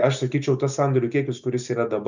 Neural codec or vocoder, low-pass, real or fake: none; 7.2 kHz; real